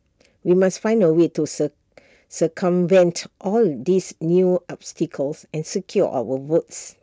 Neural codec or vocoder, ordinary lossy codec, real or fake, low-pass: none; none; real; none